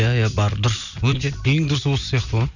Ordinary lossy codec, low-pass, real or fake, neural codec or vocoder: none; 7.2 kHz; real; none